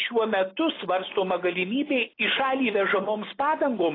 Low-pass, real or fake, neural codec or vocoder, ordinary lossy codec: 5.4 kHz; real; none; AAC, 24 kbps